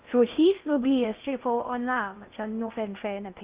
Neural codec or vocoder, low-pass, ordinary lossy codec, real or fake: codec, 16 kHz in and 24 kHz out, 0.6 kbps, FocalCodec, streaming, 4096 codes; 3.6 kHz; Opus, 32 kbps; fake